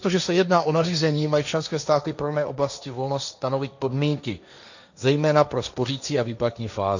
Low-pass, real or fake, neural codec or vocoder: 7.2 kHz; fake; codec, 16 kHz, 1.1 kbps, Voila-Tokenizer